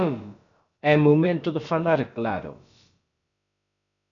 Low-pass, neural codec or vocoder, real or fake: 7.2 kHz; codec, 16 kHz, about 1 kbps, DyCAST, with the encoder's durations; fake